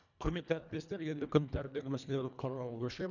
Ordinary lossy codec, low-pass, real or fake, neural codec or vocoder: none; 7.2 kHz; fake; codec, 24 kHz, 1.5 kbps, HILCodec